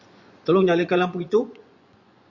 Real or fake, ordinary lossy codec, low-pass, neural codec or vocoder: fake; Opus, 64 kbps; 7.2 kHz; vocoder, 24 kHz, 100 mel bands, Vocos